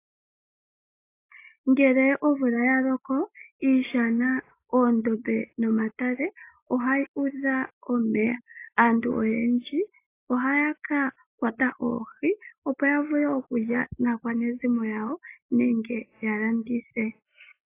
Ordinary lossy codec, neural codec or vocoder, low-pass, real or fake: AAC, 24 kbps; none; 3.6 kHz; real